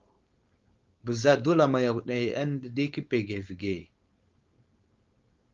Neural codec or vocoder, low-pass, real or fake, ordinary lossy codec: codec, 16 kHz, 4.8 kbps, FACodec; 7.2 kHz; fake; Opus, 32 kbps